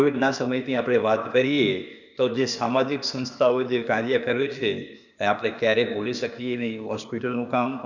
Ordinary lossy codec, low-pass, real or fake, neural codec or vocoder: none; 7.2 kHz; fake; codec, 16 kHz, 0.8 kbps, ZipCodec